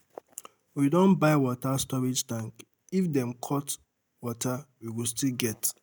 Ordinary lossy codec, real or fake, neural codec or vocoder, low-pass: none; real; none; none